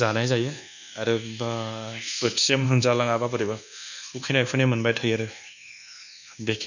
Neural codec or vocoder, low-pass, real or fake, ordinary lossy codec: codec, 24 kHz, 1.2 kbps, DualCodec; 7.2 kHz; fake; none